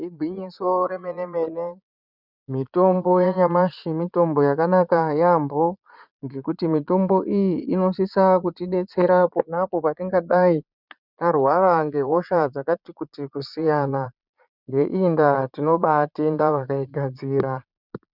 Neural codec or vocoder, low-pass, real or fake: vocoder, 22.05 kHz, 80 mel bands, Vocos; 5.4 kHz; fake